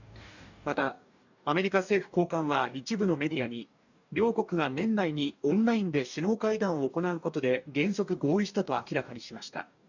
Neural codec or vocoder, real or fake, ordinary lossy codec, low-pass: codec, 44.1 kHz, 2.6 kbps, DAC; fake; none; 7.2 kHz